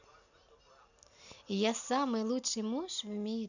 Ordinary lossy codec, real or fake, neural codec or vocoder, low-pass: none; real; none; 7.2 kHz